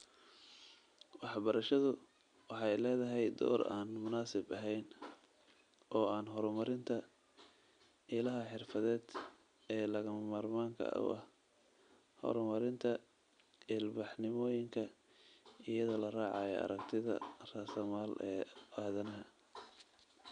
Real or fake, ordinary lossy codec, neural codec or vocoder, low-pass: real; none; none; 9.9 kHz